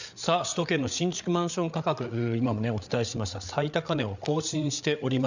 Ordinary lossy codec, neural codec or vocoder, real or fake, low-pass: none; codec, 16 kHz, 8 kbps, FreqCodec, larger model; fake; 7.2 kHz